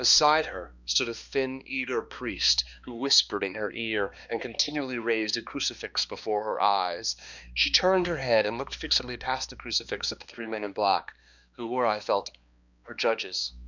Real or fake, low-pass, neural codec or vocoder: fake; 7.2 kHz; codec, 16 kHz, 2 kbps, X-Codec, HuBERT features, trained on balanced general audio